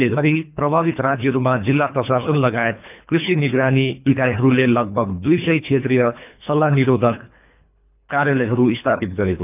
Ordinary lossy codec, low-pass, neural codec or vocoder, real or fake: none; 3.6 kHz; codec, 24 kHz, 3 kbps, HILCodec; fake